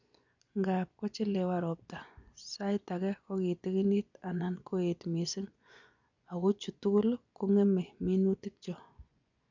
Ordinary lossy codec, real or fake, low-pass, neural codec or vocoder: none; fake; 7.2 kHz; vocoder, 24 kHz, 100 mel bands, Vocos